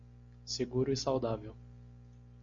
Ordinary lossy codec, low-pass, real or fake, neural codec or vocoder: MP3, 48 kbps; 7.2 kHz; real; none